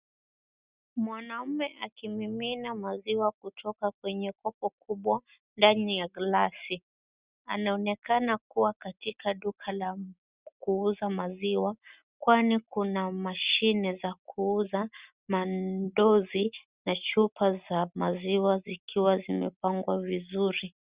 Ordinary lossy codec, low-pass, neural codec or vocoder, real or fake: Opus, 64 kbps; 3.6 kHz; none; real